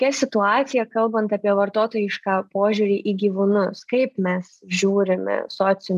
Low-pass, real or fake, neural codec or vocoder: 14.4 kHz; real; none